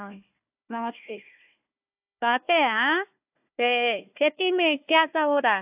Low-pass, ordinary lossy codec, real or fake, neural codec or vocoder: 3.6 kHz; AAC, 32 kbps; fake; codec, 16 kHz, 1 kbps, FunCodec, trained on Chinese and English, 50 frames a second